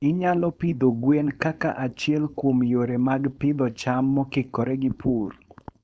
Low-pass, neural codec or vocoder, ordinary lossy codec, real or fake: none; codec, 16 kHz, 4.8 kbps, FACodec; none; fake